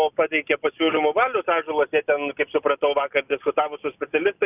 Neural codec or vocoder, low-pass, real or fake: none; 3.6 kHz; real